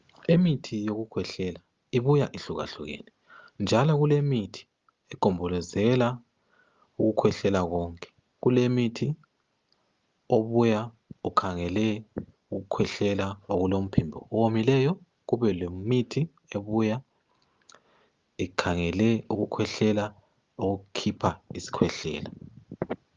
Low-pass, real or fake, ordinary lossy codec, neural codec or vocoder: 7.2 kHz; real; Opus, 32 kbps; none